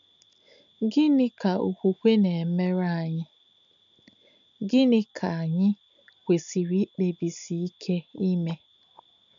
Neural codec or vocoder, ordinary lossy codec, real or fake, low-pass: none; AAC, 64 kbps; real; 7.2 kHz